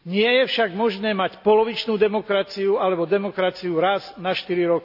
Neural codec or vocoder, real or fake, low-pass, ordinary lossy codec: none; real; 5.4 kHz; AAC, 48 kbps